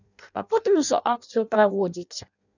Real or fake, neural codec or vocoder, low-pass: fake; codec, 16 kHz in and 24 kHz out, 0.6 kbps, FireRedTTS-2 codec; 7.2 kHz